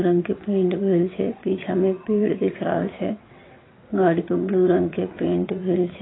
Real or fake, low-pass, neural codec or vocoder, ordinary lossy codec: fake; 7.2 kHz; vocoder, 22.05 kHz, 80 mel bands, WaveNeXt; AAC, 16 kbps